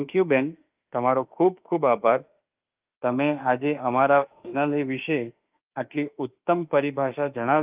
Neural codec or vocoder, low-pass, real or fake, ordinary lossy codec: autoencoder, 48 kHz, 32 numbers a frame, DAC-VAE, trained on Japanese speech; 3.6 kHz; fake; Opus, 24 kbps